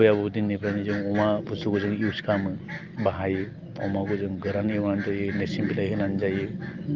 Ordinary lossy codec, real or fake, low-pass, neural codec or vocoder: Opus, 32 kbps; real; 7.2 kHz; none